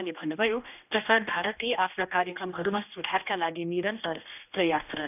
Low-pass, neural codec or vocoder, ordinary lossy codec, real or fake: 3.6 kHz; codec, 16 kHz, 1 kbps, X-Codec, HuBERT features, trained on general audio; none; fake